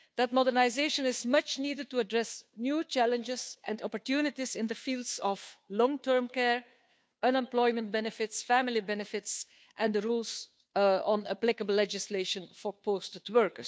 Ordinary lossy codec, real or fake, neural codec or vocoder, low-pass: none; fake; codec, 16 kHz, 2 kbps, FunCodec, trained on Chinese and English, 25 frames a second; none